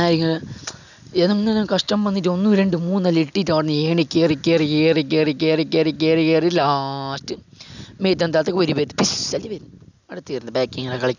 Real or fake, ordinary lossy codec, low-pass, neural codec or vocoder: real; none; 7.2 kHz; none